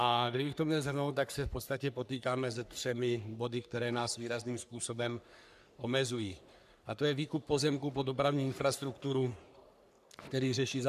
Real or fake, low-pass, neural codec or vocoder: fake; 14.4 kHz; codec, 44.1 kHz, 3.4 kbps, Pupu-Codec